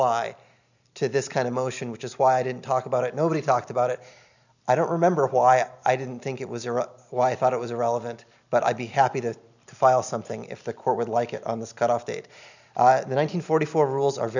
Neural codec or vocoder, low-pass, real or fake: none; 7.2 kHz; real